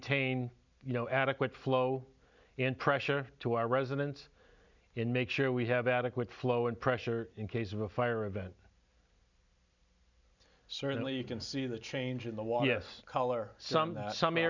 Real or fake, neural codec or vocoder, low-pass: real; none; 7.2 kHz